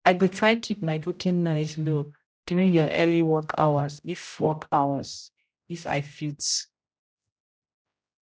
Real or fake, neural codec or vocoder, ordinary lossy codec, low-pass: fake; codec, 16 kHz, 0.5 kbps, X-Codec, HuBERT features, trained on general audio; none; none